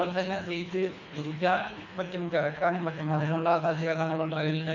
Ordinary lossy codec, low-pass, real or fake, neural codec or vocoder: none; 7.2 kHz; fake; codec, 24 kHz, 1.5 kbps, HILCodec